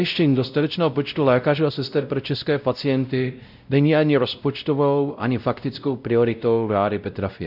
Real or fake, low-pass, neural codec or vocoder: fake; 5.4 kHz; codec, 16 kHz, 0.5 kbps, X-Codec, WavLM features, trained on Multilingual LibriSpeech